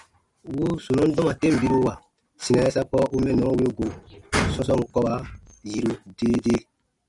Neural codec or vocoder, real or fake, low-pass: none; real; 10.8 kHz